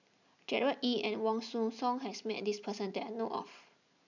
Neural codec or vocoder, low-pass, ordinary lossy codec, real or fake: none; 7.2 kHz; none; real